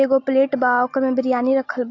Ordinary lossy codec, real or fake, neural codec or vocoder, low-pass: AAC, 48 kbps; real; none; 7.2 kHz